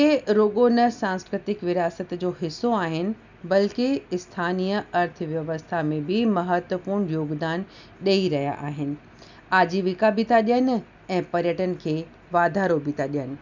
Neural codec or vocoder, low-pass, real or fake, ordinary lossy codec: none; 7.2 kHz; real; none